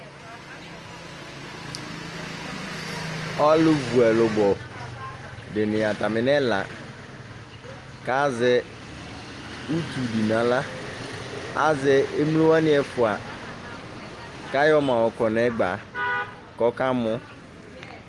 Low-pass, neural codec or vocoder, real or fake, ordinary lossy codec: 10.8 kHz; none; real; Opus, 24 kbps